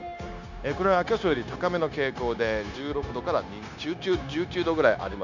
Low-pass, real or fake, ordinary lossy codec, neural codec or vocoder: 7.2 kHz; fake; none; codec, 16 kHz, 0.9 kbps, LongCat-Audio-Codec